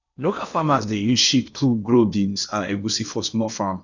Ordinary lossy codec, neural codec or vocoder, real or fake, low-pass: none; codec, 16 kHz in and 24 kHz out, 0.8 kbps, FocalCodec, streaming, 65536 codes; fake; 7.2 kHz